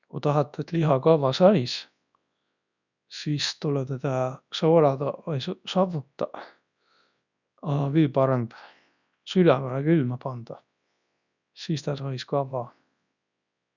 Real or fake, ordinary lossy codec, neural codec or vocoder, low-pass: fake; none; codec, 24 kHz, 0.9 kbps, WavTokenizer, large speech release; 7.2 kHz